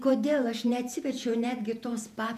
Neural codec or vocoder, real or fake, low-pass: vocoder, 48 kHz, 128 mel bands, Vocos; fake; 14.4 kHz